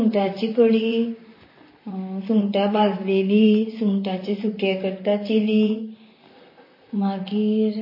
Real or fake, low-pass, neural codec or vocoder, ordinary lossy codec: fake; 5.4 kHz; vocoder, 44.1 kHz, 128 mel bands, Pupu-Vocoder; MP3, 24 kbps